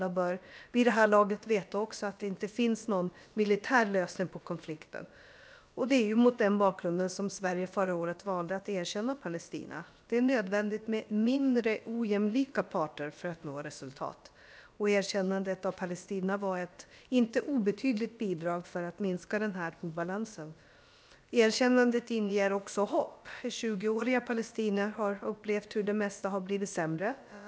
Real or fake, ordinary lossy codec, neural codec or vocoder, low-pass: fake; none; codec, 16 kHz, about 1 kbps, DyCAST, with the encoder's durations; none